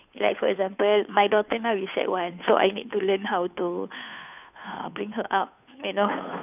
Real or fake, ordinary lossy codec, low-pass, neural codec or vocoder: fake; none; 3.6 kHz; codec, 16 kHz, 2 kbps, FunCodec, trained on Chinese and English, 25 frames a second